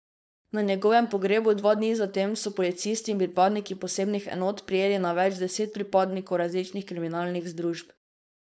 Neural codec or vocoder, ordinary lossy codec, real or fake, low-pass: codec, 16 kHz, 4.8 kbps, FACodec; none; fake; none